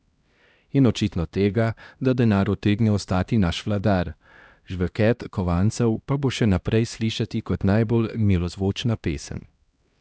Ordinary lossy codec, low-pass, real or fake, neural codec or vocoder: none; none; fake; codec, 16 kHz, 1 kbps, X-Codec, HuBERT features, trained on LibriSpeech